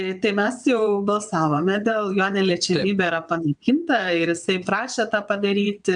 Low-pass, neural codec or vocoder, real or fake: 9.9 kHz; vocoder, 22.05 kHz, 80 mel bands, WaveNeXt; fake